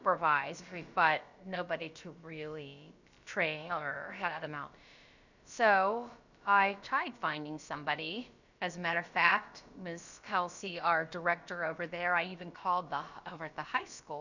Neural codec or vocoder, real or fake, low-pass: codec, 16 kHz, about 1 kbps, DyCAST, with the encoder's durations; fake; 7.2 kHz